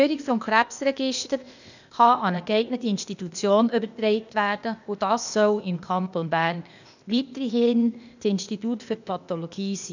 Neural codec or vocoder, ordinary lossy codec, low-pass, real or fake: codec, 16 kHz, 0.8 kbps, ZipCodec; none; 7.2 kHz; fake